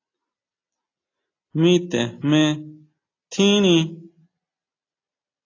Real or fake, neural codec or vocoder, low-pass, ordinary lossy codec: real; none; 7.2 kHz; AAC, 32 kbps